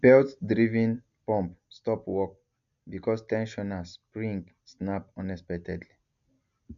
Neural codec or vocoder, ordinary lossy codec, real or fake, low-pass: none; none; real; 7.2 kHz